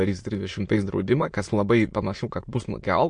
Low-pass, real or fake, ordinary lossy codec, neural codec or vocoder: 9.9 kHz; fake; MP3, 48 kbps; autoencoder, 22.05 kHz, a latent of 192 numbers a frame, VITS, trained on many speakers